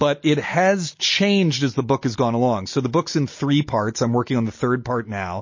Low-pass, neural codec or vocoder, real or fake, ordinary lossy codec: 7.2 kHz; none; real; MP3, 32 kbps